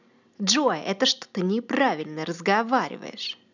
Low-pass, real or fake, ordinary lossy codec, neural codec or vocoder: 7.2 kHz; real; none; none